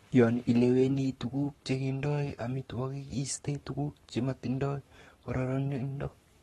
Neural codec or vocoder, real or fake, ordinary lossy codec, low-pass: codec, 44.1 kHz, 7.8 kbps, Pupu-Codec; fake; AAC, 32 kbps; 19.8 kHz